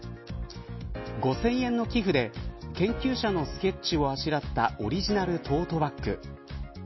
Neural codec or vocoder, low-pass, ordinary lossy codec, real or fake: none; 7.2 kHz; MP3, 24 kbps; real